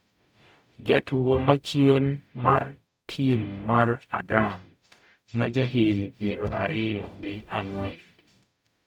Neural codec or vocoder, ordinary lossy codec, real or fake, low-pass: codec, 44.1 kHz, 0.9 kbps, DAC; none; fake; 19.8 kHz